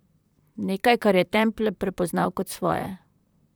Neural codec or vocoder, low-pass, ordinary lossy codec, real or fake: vocoder, 44.1 kHz, 128 mel bands, Pupu-Vocoder; none; none; fake